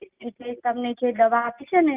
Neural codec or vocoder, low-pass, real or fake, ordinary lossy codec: none; 3.6 kHz; real; Opus, 24 kbps